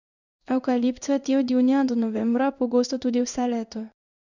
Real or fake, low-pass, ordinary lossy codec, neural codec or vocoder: fake; 7.2 kHz; none; codec, 16 kHz in and 24 kHz out, 1 kbps, XY-Tokenizer